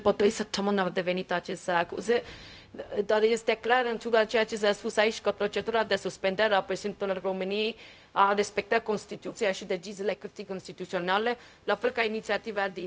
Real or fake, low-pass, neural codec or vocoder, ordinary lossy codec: fake; none; codec, 16 kHz, 0.4 kbps, LongCat-Audio-Codec; none